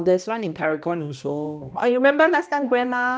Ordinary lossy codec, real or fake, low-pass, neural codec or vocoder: none; fake; none; codec, 16 kHz, 1 kbps, X-Codec, HuBERT features, trained on balanced general audio